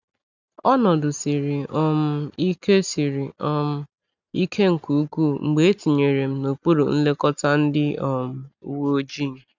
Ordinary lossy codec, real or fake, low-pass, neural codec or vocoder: none; real; 7.2 kHz; none